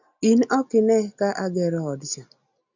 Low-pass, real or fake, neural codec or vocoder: 7.2 kHz; real; none